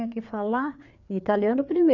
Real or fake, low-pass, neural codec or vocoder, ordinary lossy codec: fake; 7.2 kHz; codec, 16 kHz, 4 kbps, FreqCodec, larger model; none